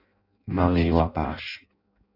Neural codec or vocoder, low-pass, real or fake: codec, 16 kHz in and 24 kHz out, 0.6 kbps, FireRedTTS-2 codec; 5.4 kHz; fake